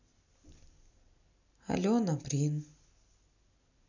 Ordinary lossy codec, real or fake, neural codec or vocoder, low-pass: none; real; none; 7.2 kHz